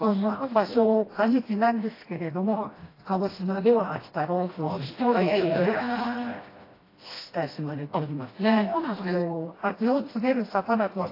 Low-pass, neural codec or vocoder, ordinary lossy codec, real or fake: 5.4 kHz; codec, 16 kHz, 1 kbps, FreqCodec, smaller model; AAC, 24 kbps; fake